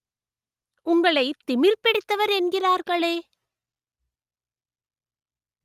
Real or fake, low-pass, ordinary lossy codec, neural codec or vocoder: real; 14.4 kHz; Opus, 32 kbps; none